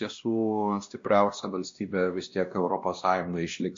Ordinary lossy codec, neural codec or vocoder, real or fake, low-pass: MP3, 48 kbps; codec, 16 kHz, 1 kbps, X-Codec, WavLM features, trained on Multilingual LibriSpeech; fake; 7.2 kHz